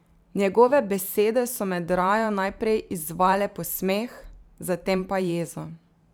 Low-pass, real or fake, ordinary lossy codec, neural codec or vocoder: none; fake; none; vocoder, 44.1 kHz, 128 mel bands every 512 samples, BigVGAN v2